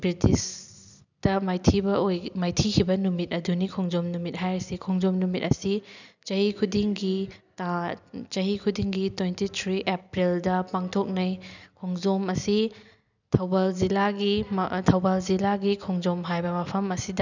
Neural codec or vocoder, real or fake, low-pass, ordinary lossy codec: none; real; 7.2 kHz; none